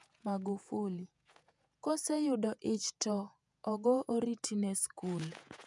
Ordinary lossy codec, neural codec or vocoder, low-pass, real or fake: none; vocoder, 48 kHz, 128 mel bands, Vocos; 10.8 kHz; fake